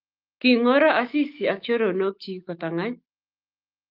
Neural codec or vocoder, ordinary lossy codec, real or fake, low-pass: autoencoder, 48 kHz, 128 numbers a frame, DAC-VAE, trained on Japanese speech; Opus, 24 kbps; fake; 5.4 kHz